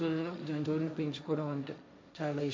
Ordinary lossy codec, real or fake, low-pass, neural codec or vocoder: none; fake; none; codec, 16 kHz, 1.1 kbps, Voila-Tokenizer